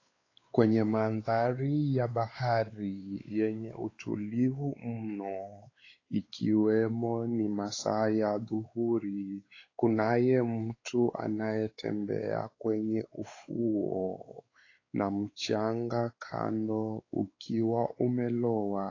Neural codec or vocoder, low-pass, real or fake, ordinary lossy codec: codec, 16 kHz, 4 kbps, X-Codec, WavLM features, trained on Multilingual LibriSpeech; 7.2 kHz; fake; AAC, 32 kbps